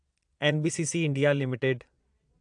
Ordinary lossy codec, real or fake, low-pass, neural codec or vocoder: AAC, 64 kbps; fake; 9.9 kHz; vocoder, 22.05 kHz, 80 mel bands, Vocos